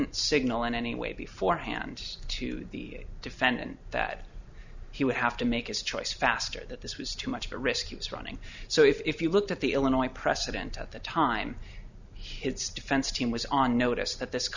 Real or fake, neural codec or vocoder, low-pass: real; none; 7.2 kHz